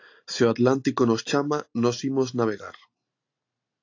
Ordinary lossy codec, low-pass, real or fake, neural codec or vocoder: AAC, 48 kbps; 7.2 kHz; real; none